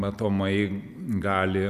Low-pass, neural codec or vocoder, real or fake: 14.4 kHz; none; real